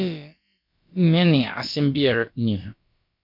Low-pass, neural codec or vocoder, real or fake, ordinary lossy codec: 5.4 kHz; codec, 16 kHz, about 1 kbps, DyCAST, with the encoder's durations; fake; MP3, 48 kbps